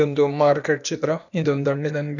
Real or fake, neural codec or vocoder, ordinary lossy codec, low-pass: fake; codec, 16 kHz, 0.8 kbps, ZipCodec; none; 7.2 kHz